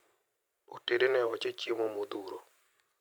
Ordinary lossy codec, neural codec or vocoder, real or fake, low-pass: none; vocoder, 44.1 kHz, 128 mel bands every 512 samples, BigVGAN v2; fake; none